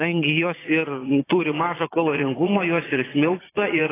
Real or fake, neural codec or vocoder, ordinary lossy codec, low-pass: fake; vocoder, 22.05 kHz, 80 mel bands, Vocos; AAC, 16 kbps; 3.6 kHz